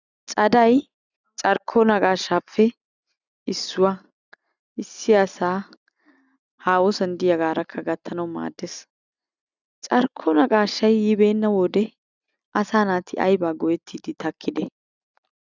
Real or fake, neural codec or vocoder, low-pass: real; none; 7.2 kHz